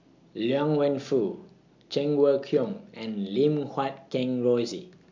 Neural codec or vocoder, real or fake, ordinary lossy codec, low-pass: none; real; MP3, 64 kbps; 7.2 kHz